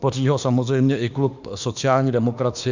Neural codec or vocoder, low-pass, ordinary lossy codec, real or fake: autoencoder, 48 kHz, 32 numbers a frame, DAC-VAE, trained on Japanese speech; 7.2 kHz; Opus, 64 kbps; fake